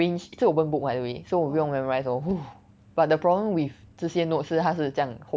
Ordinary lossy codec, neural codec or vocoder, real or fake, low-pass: none; none; real; none